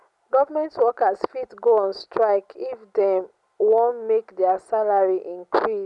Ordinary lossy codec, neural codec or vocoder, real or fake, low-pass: none; none; real; 10.8 kHz